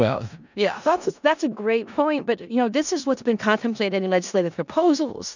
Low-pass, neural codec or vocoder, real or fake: 7.2 kHz; codec, 16 kHz in and 24 kHz out, 0.4 kbps, LongCat-Audio-Codec, four codebook decoder; fake